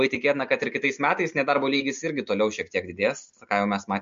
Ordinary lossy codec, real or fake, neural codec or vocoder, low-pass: MP3, 48 kbps; real; none; 7.2 kHz